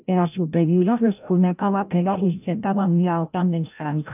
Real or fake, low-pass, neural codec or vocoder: fake; 3.6 kHz; codec, 16 kHz, 0.5 kbps, FreqCodec, larger model